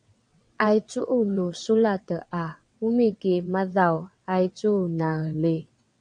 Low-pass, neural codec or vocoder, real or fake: 9.9 kHz; vocoder, 22.05 kHz, 80 mel bands, WaveNeXt; fake